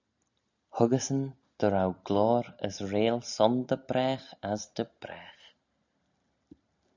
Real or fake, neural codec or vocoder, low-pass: real; none; 7.2 kHz